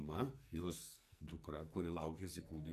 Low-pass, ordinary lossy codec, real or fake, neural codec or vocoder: 14.4 kHz; AAC, 96 kbps; fake; codec, 44.1 kHz, 2.6 kbps, SNAC